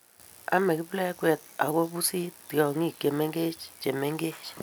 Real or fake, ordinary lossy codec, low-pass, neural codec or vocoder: real; none; none; none